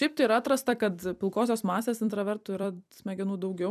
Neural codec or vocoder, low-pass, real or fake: vocoder, 44.1 kHz, 128 mel bands every 256 samples, BigVGAN v2; 14.4 kHz; fake